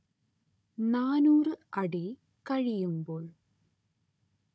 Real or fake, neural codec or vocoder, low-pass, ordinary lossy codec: fake; codec, 16 kHz, 16 kbps, FunCodec, trained on Chinese and English, 50 frames a second; none; none